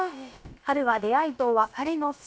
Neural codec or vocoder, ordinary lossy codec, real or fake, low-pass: codec, 16 kHz, about 1 kbps, DyCAST, with the encoder's durations; none; fake; none